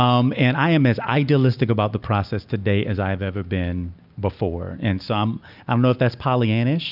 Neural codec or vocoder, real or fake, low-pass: none; real; 5.4 kHz